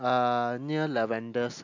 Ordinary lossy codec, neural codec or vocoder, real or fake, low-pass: AAC, 48 kbps; none; real; 7.2 kHz